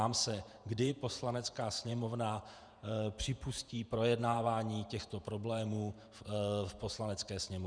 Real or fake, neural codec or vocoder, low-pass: fake; vocoder, 48 kHz, 128 mel bands, Vocos; 9.9 kHz